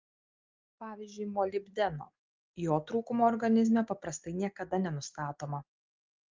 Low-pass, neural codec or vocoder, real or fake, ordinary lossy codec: 7.2 kHz; none; real; Opus, 32 kbps